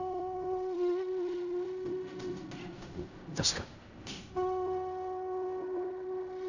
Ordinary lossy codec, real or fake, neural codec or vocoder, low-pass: none; fake; codec, 16 kHz in and 24 kHz out, 0.4 kbps, LongCat-Audio-Codec, fine tuned four codebook decoder; 7.2 kHz